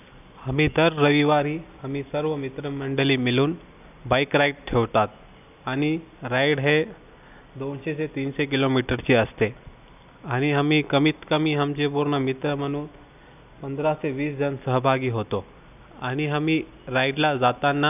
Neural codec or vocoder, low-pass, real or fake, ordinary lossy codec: none; 3.6 kHz; real; none